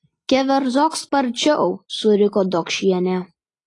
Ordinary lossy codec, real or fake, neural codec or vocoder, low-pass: AAC, 32 kbps; real; none; 10.8 kHz